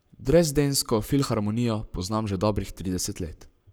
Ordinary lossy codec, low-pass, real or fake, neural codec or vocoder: none; none; fake; codec, 44.1 kHz, 7.8 kbps, Pupu-Codec